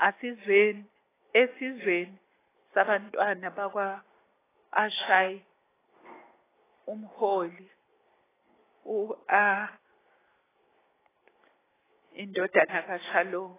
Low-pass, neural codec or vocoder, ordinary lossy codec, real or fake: 3.6 kHz; none; AAC, 16 kbps; real